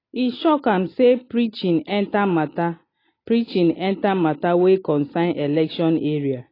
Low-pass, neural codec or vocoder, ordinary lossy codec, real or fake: 5.4 kHz; none; AAC, 24 kbps; real